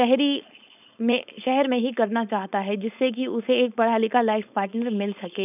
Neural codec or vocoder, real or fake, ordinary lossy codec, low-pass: codec, 16 kHz, 4.8 kbps, FACodec; fake; none; 3.6 kHz